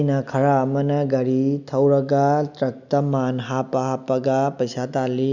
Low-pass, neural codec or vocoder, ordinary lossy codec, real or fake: 7.2 kHz; none; none; real